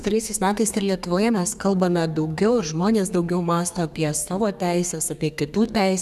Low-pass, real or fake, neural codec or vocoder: 14.4 kHz; fake; codec, 32 kHz, 1.9 kbps, SNAC